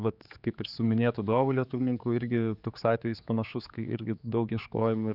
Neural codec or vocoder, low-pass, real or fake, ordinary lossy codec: codec, 16 kHz, 4 kbps, X-Codec, HuBERT features, trained on general audio; 5.4 kHz; fake; Opus, 64 kbps